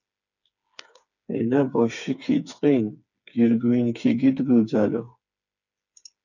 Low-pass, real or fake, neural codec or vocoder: 7.2 kHz; fake; codec, 16 kHz, 4 kbps, FreqCodec, smaller model